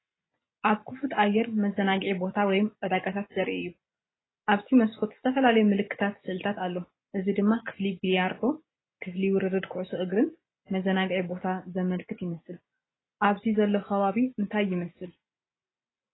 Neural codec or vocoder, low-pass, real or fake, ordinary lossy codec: none; 7.2 kHz; real; AAC, 16 kbps